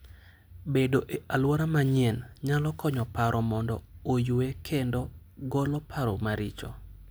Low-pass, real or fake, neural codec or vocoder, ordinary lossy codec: none; real; none; none